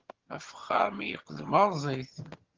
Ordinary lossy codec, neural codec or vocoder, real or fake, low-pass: Opus, 16 kbps; vocoder, 22.05 kHz, 80 mel bands, HiFi-GAN; fake; 7.2 kHz